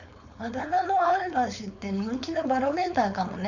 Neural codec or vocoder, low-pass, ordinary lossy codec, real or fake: codec, 16 kHz, 4.8 kbps, FACodec; 7.2 kHz; none; fake